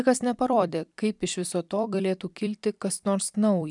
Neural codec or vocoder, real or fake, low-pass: vocoder, 44.1 kHz, 128 mel bands every 256 samples, BigVGAN v2; fake; 10.8 kHz